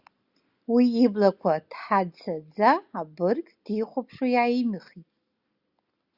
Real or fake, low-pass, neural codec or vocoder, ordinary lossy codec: real; 5.4 kHz; none; Opus, 64 kbps